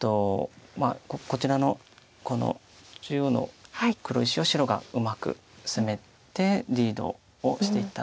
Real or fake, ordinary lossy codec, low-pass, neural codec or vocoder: real; none; none; none